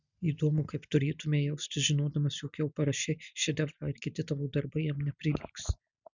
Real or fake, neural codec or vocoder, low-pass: real; none; 7.2 kHz